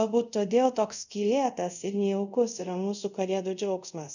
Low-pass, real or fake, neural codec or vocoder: 7.2 kHz; fake; codec, 24 kHz, 0.5 kbps, DualCodec